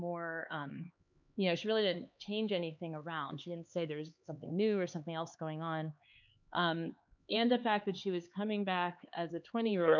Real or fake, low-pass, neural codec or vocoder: fake; 7.2 kHz; codec, 16 kHz, 4 kbps, X-Codec, HuBERT features, trained on LibriSpeech